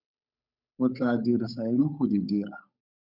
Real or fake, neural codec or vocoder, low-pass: fake; codec, 16 kHz, 8 kbps, FunCodec, trained on Chinese and English, 25 frames a second; 5.4 kHz